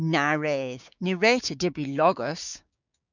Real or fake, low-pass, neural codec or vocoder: fake; 7.2 kHz; codec, 16 kHz, 6 kbps, DAC